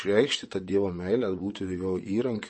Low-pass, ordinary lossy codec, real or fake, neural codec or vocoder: 9.9 kHz; MP3, 32 kbps; fake; vocoder, 22.05 kHz, 80 mel bands, WaveNeXt